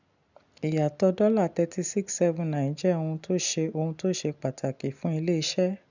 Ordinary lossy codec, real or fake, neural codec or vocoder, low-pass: none; real; none; 7.2 kHz